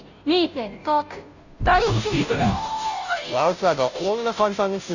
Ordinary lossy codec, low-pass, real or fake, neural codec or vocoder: none; 7.2 kHz; fake; codec, 16 kHz, 0.5 kbps, FunCodec, trained on Chinese and English, 25 frames a second